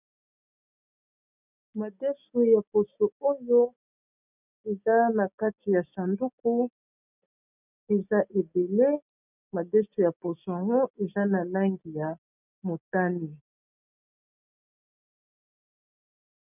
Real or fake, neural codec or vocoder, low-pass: real; none; 3.6 kHz